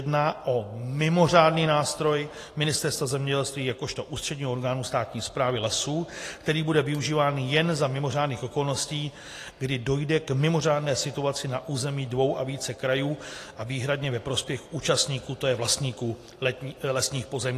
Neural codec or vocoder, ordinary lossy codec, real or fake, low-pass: none; AAC, 48 kbps; real; 14.4 kHz